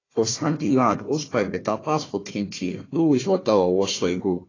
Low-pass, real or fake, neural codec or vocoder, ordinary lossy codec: 7.2 kHz; fake; codec, 16 kHz, 1 kbps, FunCodec, trained on Chinese and English, 50 frames a second; AAC, 32 kbps